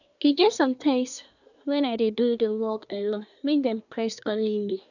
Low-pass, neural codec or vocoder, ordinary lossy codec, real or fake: 7.2 kHz; codec, 24 kHz, 1 kbps, SNAC; none; fake